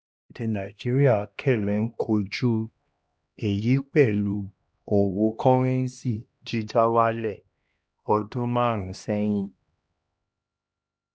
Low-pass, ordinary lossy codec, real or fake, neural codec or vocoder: none; none; fake; codec, 16 kHz, 1 kbps, X-Codec, HuBERT features, trained on LibriSpeech